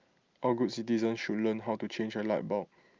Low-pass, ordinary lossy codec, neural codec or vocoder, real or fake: 7.2 kHz; Opus, 24 kbps; none; real